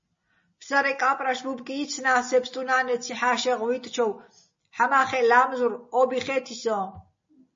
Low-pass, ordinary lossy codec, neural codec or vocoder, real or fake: 7.2 kHz; MP3, 32 kbps; none; real